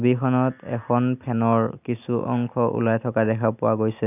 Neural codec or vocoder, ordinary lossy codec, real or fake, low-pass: none; none; real; 3.6 kHz